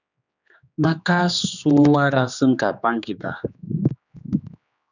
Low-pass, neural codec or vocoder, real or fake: 7.2 kHz; codec, 16 kHz, 2 kbps, X-Codec, HuBERT features, trained on general audio; fake